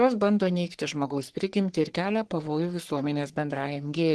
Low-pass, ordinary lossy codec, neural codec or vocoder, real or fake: 10.8 kHz; Opus, 16 kbps; codec, 44.1 kHz, 3.4 kbps, Pupu-Codec; fake